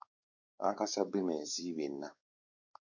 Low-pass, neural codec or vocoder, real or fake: 7.2 kHz; codec, 16 kHz, 4 kbps, X-Codec, WavLM features, trained on Multilingual LibriSpeech; fake